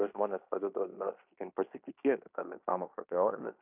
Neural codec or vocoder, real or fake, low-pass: codec, 16 kHz in and 24 kHz out, 0.9 kbps, LongCat-Audio-Codec, fine tuned four codebook decoder; fake; 3.6 kHz